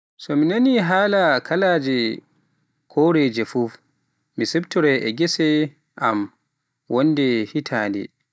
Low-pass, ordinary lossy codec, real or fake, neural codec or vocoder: none; none; real; none